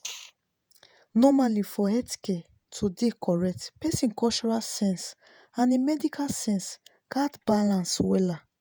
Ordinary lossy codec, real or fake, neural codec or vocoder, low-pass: none; fake; vocoder, 48 kHz, 128 mel bands, Vocos; none